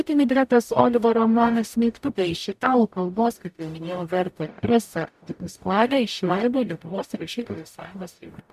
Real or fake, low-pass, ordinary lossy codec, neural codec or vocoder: fake; 14.4 kHz; MP3, 96 kbps; codec, 44.1 kHz, 0.9 kbps, DAC